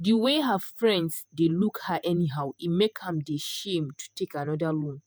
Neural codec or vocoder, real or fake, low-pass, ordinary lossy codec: vocoder, 48 kHz, 128 mel bands, Vocos; fake; none; none